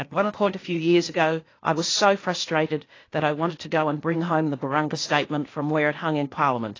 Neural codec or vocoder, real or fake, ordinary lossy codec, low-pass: codec, 16 kHz, 0.8 kbps, ZipCodec; fake; AAC, 32 kbps; 7.2 kHz